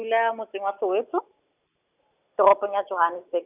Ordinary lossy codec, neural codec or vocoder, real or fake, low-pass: none; none; real; 3.6 kHz